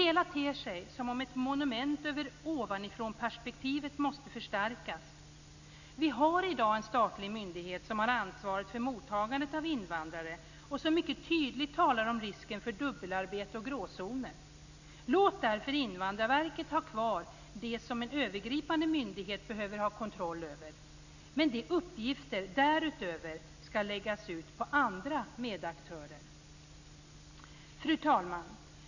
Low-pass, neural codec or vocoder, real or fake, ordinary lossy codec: 7.2 kHz; none; real; none